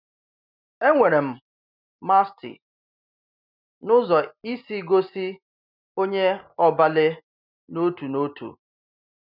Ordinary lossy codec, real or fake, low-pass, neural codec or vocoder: none; real; 5.4 kHz; none